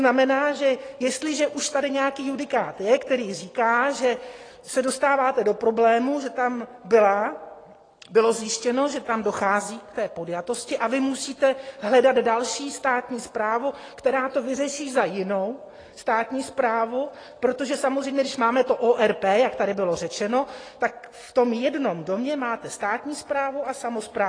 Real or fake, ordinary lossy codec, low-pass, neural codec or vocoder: real; AAC, 32 kbps; 9.9 kHz; none